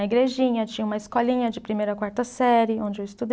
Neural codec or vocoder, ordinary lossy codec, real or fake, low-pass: none; none; real; none